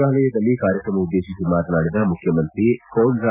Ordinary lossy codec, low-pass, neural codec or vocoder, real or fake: none; 3.6 kHz; none; real